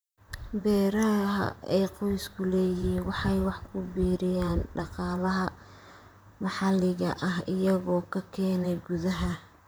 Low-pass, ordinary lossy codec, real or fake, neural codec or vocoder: none; none; fake; vocoder, 44.1 kHz, 128 mel bands, Pupu-Vocoder